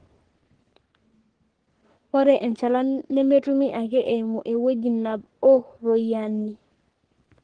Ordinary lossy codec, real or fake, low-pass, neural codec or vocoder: Opus, 16 kbps; fake; 9.9 kHz; codec, 44.1 kHz, 3.4 kbps, Pupu-Codec